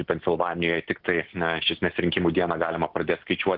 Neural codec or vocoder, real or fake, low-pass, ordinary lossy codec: none; real; 5.4 kHz; Opus, 16 kbps